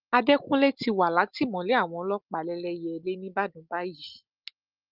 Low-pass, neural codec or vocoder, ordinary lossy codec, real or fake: 5.4 kHz; none; Opus, 32 kbps; real